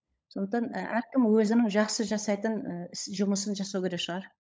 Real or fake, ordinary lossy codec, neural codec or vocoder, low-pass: fake; none; codec, 16 kHz, 16 kbps, FunCodec, trained on LibriTTS, 50 frames a second; none